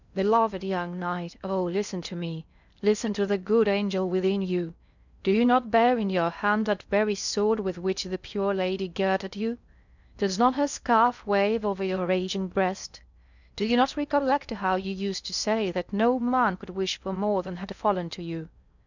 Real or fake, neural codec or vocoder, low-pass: fake; codec, 16 kHz in and 24 kHz out, 0.6 kbps, FocalCodec, streaming, 2048 codes; 7.2 kHz